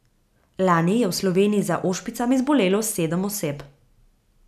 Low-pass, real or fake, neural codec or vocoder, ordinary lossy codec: 14.4 kHz; real; none; none